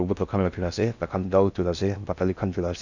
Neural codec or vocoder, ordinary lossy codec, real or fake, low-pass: codec, 16 kHz in and 24 kHz out, 0.6 kbps, FocalCodec, streaming, 2048 codes; none; fake; 7.2 kHz